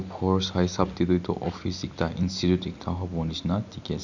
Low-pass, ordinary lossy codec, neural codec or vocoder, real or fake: 7.2 kHz; none; none; real